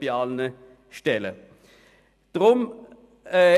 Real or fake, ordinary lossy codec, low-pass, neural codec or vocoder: real; none; 14.4 kHz; none